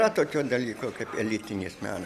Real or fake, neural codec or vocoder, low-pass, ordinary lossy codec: real; none; 14.4 kHz; Opus, 64 kbps